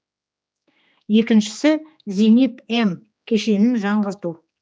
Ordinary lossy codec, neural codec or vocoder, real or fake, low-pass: none; codec, 16 kHz, 2 kbps, X-Codec, HuBERT features, trained on general audio; fake; none